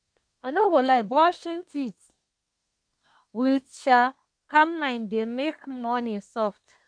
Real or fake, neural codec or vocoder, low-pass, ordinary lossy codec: fake; codec, 24 kHz, 1 kbps, SNAC; 9.9 kHz; none